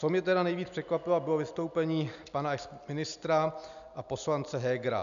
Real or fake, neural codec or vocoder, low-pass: real; none; 7.2 kHz